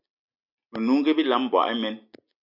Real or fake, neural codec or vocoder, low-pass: real; none; 5.4 kHz